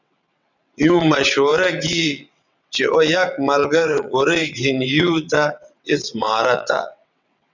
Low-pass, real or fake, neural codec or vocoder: 7.2 kHz; fake; vocoder, 22.05 kHz, 80 mel bands, WaveNeXt